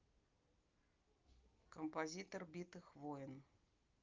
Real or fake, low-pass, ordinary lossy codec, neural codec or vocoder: real; 7.2 kHz; Opus, 24 kbps; none